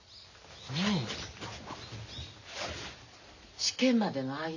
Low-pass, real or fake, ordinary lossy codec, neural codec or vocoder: 7.2 kHz; real; none; none